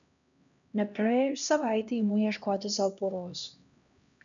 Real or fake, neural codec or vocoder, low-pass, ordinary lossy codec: fake; codec, 16 kHz, 1 kbps, X-Codec, HuBERT features, trained on LibriSpeech; 7.2 kHz; MP3, 96 kbps